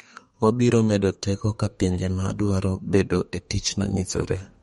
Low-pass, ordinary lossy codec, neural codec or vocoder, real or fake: 14.4 kHz; MP3, 48 kbps; codec, 32 kHz, 1.9 kbps, SNAC; fake